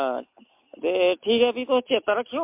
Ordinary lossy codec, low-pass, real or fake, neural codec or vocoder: MP3, 32 kbps; 3.6 kHz; real; none